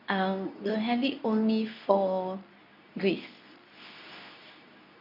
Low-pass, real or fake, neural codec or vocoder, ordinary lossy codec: 5.4 kHz; fake; codec, 24 kHz, 0.9 kbps, WavTokenizer, medium speech release version 2; none